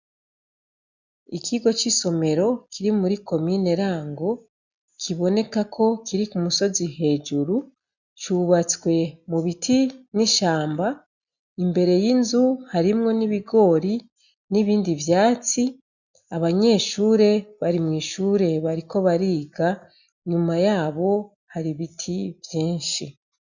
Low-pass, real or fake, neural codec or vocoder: 7.2 kHz; real; none